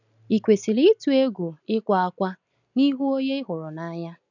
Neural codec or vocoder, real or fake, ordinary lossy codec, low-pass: none; real; none; 7.2 kHz